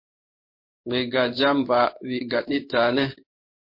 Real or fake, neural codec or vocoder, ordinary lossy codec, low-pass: fake; codec, 16 kHz in and 24 kHz out, 1 kbps, XY-Tokenizer; MP3, 32 kbps; 5.4 kHz